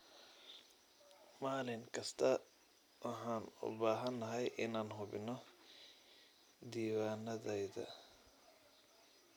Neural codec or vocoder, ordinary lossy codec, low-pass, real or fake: none; none; none; real